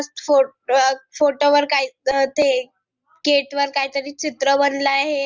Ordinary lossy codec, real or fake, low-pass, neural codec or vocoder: Opus, 24 kbps; real; 7.2 kHz; none